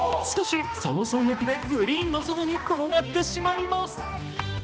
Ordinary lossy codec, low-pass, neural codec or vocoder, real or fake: none; none; codec, 16 kHz, 1 kbps, X-Codec, HuBERT features, trained on balanced general audio; fake